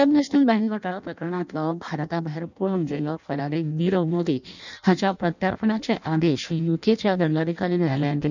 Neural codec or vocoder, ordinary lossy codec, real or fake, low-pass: codec, 16 kHz in and 24 kHz out, 0.6 kbps, FireRedTTS-2 codec; none; fake; 7.2 kHz